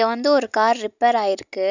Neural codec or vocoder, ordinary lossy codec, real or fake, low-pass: none; none; real; 7.2 kHz